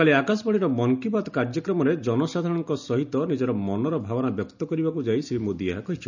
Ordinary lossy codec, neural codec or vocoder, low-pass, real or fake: none; none; 7.2 kHz; real